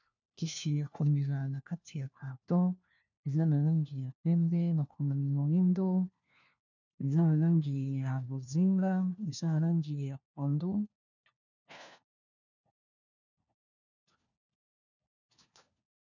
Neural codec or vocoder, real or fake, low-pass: codec, 16 kHz, 1 kbps, FunCodec, trained on LibriTTS, 50 frames a second; fake; 7.2 kHz